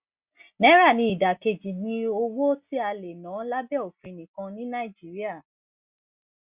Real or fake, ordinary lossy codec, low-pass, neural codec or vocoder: real; Opus, 64 kbps; 3.6 kHz; none